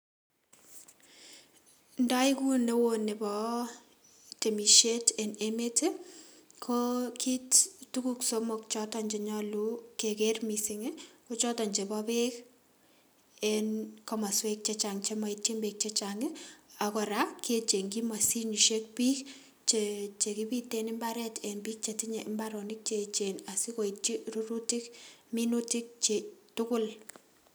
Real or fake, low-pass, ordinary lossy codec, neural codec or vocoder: real; none; none; none